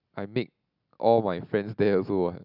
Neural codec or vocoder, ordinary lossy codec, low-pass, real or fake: none; none; 5.4 kHz; real